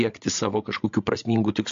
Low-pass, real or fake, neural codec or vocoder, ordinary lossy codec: 7.2 kHz; fake; codec, 16 kHz, 16 kbps, FreqCodec, larger model; MP3, 48 kbps